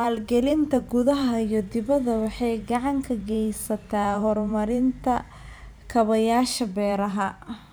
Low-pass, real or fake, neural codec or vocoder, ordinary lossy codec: none; fake; vocoder, 44.1 kHz, 128 mel bands every 512 samples, BigVGAN v2; none